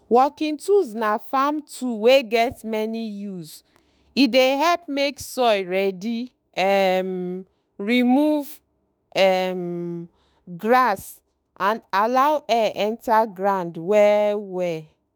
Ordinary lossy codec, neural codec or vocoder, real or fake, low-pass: none; autoencoder, 48 kHz, 32 numbers a frame, DAC-VAE, trained on Japanese speech; fake; none